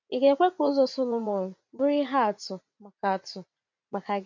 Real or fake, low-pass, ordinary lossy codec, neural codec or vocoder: fake; 7.2 kHz; MP3, 48 kbps; vocoder, 44.1 kHz, 80 mel bands, Vocos